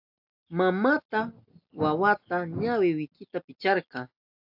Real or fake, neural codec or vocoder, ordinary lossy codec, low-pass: real; none; AAC, 48 kbps; 5.4 kHz